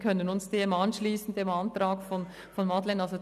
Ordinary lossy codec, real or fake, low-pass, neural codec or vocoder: none; real; 14.4 kHz; none